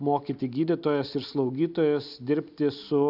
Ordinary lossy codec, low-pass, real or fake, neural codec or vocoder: AAC, 48 kbps; 5.4 kHz; real; none